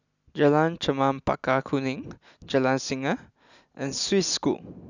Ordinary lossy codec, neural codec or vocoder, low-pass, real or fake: none; none; 7.2 kHz; real